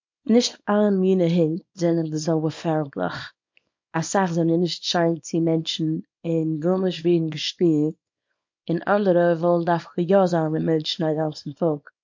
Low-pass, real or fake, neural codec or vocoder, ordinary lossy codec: 7.2 kHz; fake; codec, 24 kHz, 0.9 kbps, WavTokenizer, small release; MP3, 48 kbps